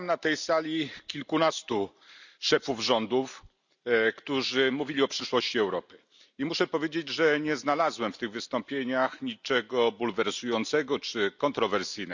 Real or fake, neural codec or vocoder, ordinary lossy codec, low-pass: real; none; none; 7.2 kHz